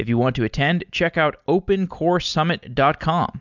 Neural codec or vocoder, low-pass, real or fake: none; 7.2 kHz; real